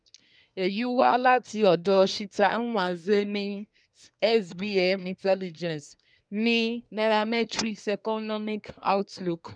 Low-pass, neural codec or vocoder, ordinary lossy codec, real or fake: 9.9 kHz; codec, 24 kHz, 1 kbps, SNAC; none; fake